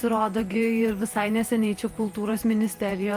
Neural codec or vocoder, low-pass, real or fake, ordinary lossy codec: vocoder, 48 kHz, 128 mel bands, Vocos; 14.4 kHz; fake; Opus, 32 kbps